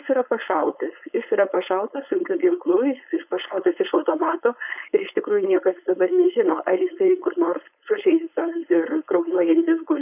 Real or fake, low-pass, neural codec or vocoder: fake; 3.6 kHz; codec, 16 kHz, 4.8 kbps, FACodec